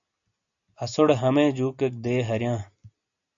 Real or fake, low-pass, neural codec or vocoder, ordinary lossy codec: real; 7.2 kHz; none; AAC, 64 kbps